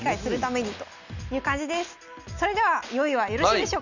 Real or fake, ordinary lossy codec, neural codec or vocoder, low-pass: real; none; none; 7.2 kHz